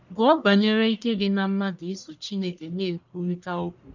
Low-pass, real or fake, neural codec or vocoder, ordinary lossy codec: 7.2 kHz; fake; codec, 44.1 kHz, 1.7 kbps, Pupu-Codec; none